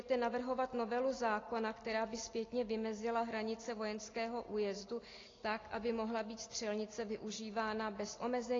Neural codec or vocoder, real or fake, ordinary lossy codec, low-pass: none; real; AAC, 32 kbps; 7.2 kHz